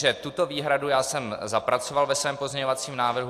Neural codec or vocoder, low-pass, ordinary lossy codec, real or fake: none; 14.4 kHz; AAC, 64 kbps; real